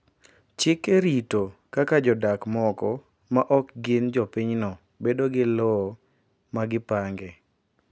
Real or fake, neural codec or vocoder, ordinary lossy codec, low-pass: real; none; none; none